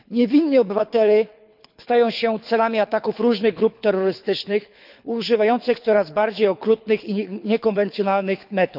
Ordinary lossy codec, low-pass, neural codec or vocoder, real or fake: none; 5.4 kHz; codec, 24 kHz, 6 kbps, HILCodec; fake